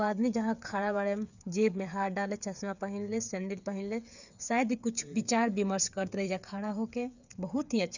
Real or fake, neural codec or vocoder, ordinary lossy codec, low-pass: fake; codec, 16 kHz, 8 kbps, FreqCodec, smaller model; none; 7.2 kHz